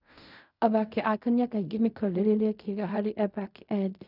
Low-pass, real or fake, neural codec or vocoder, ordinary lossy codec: 5.4 kHz; fake; codec, 16 kHz in and 24 kHz out, 0.4 kbps, LongCat-Audio-Codec, fine tuned four codebook decoder; none